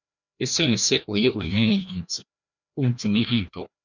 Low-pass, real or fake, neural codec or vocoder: 7.2 kHz; fake; codec, 16 kHz, 1 kbps, FreqCodec, larger model